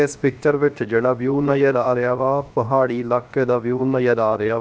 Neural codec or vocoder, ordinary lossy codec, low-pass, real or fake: codec, 16 kHz, 0.7 kbps, FocalCodec; none; none; fake